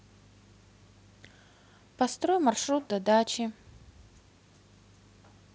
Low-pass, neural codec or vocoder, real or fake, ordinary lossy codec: none; none; real; none